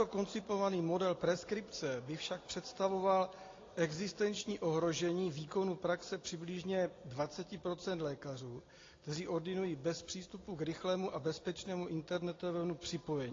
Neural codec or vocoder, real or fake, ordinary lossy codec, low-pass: none; real; AAC, 32 kbps; 7.2 kHz